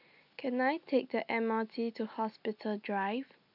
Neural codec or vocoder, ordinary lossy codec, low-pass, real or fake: none; none; 5.4 kHz; real